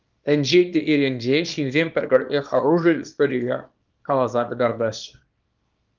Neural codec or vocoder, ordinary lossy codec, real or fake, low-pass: codec, 24 kHz, 0.9 kbps, WavTokenizer, small release; Opus, 24 kbps; fake; 7.2 kHz